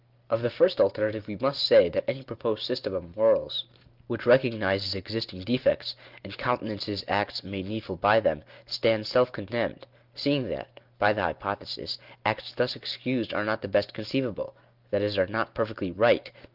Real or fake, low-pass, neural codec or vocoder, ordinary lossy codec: real; 5.4 kHz; none; Opus, 32 kbps